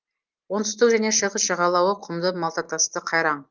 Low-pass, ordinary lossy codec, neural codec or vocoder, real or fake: 7.2 kHz; Opus, 24 kbps; none; real